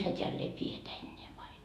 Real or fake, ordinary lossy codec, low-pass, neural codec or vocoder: fake; none; 14.4 kHz; autoencoder, 48 kHz, 128 numbers a frame, DAC-VAE, trained on Japanese speech